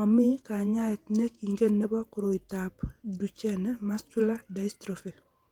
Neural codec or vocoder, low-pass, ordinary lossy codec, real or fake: vocoder, 48 kHz, 128 mel bands, Vocos; 19.8 kHz; Opus, 24 kbps; fake